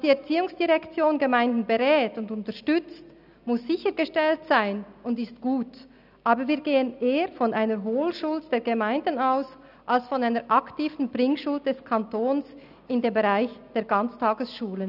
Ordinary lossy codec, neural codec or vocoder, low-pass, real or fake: none; none; 5.4 kHz; real